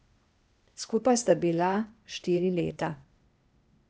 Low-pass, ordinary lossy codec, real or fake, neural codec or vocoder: none; none; fake; codec, 16 kHz, 0.8 kbps, ZipCodec